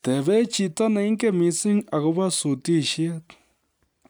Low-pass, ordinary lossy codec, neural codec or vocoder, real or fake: none; none; none; real